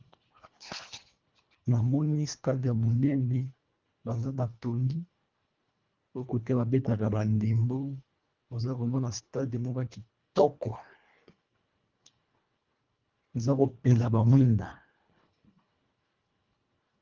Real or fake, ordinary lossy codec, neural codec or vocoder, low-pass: fake; Opus, 32 kbps; codec, 24 kHz, 1.5 kbps, HILCodec; 7.2 kHz